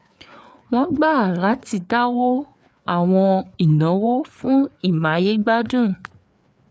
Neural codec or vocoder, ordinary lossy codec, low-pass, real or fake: codec, 16 kHz, 4 kbps, FreqCodec, larger model; none; none; fake